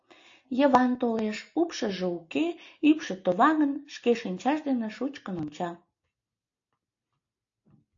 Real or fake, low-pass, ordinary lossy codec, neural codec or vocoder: real; 7.2 kHz; AAC, 48 kbps; none